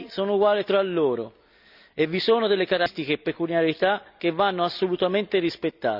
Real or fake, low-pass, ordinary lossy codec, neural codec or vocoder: real; 5.4 kHz; none; none